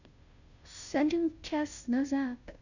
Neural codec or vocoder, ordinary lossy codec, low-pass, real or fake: codec, 16 kHz, 0.5 kbps, FunCodec, trained on Chinese and English, 25 frames a second; none; 7.2 kHz; fake